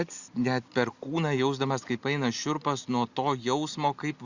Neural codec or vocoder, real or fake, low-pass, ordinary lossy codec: none; real; 7.2 kHz; Opus, 64 kbps